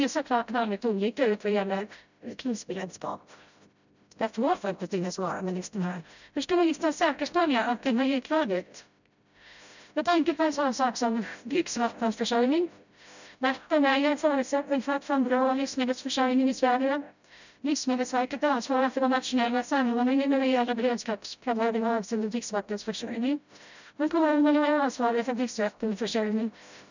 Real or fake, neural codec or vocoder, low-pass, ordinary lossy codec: fake; codec, 16 kHz, 0.5 kbps, FreqCodec, smaller model; 7.2 kHz; none